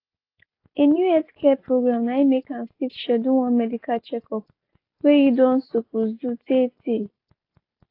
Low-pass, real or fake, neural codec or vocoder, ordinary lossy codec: 5.4 kHz; real; none; AAC, 32 kbps